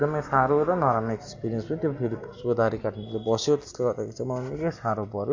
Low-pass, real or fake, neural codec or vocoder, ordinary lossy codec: 7.2 kHz; real; none; MP3, 48 kbps